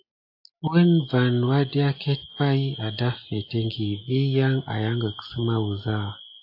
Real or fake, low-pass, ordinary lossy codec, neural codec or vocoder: real; 5.4 kHz; AAC, 32 kbps; none